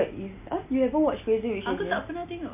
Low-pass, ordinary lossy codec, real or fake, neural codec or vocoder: 3.6 kHz; MP3, 24 kbps; real; none